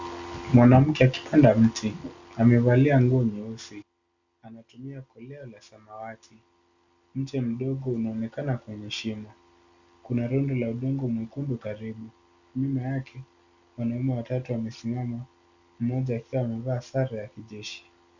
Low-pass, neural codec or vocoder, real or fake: 7.2 kHz; none; real